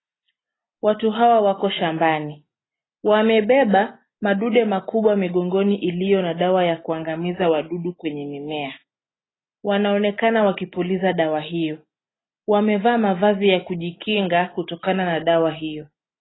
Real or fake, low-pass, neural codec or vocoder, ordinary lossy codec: real; 7.2 kHz; none; AAC, 16 kbps